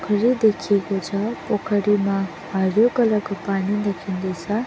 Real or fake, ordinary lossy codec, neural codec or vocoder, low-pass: real; none; none; none